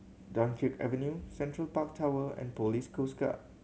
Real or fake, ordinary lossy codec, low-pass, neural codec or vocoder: real; none; none; none